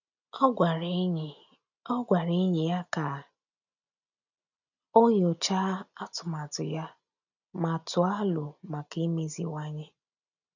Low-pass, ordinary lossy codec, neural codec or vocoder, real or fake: 7.2 kHz; none; none; real